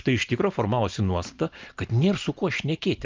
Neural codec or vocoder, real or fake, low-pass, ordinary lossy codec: none; real; 7.2 kHz; Opus, 24 kbps